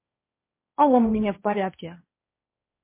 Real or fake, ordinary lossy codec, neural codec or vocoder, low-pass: fake; MP3, 24 kbps; codec, 16 kHz, 1.1 kbps, Voila-Tokenizer; 3.6 kHz